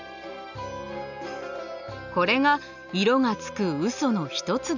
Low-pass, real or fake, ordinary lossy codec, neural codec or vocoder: 7.2 kHz; real; none; none